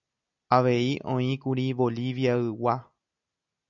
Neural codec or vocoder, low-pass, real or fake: none; 7.2 kHz; real